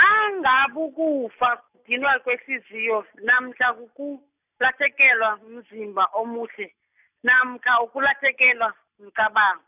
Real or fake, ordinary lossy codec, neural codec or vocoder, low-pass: real; none; none; 3.6 kHz